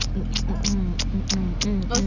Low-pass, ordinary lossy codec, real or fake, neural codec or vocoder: 7.2 kHz; none; real; none